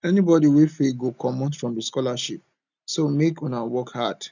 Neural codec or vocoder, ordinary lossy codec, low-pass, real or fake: none; none; 7.2 kHz; real